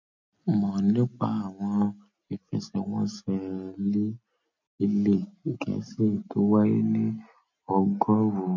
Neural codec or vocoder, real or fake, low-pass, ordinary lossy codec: none; real; 7.2 kHz; MP3, 48 kbps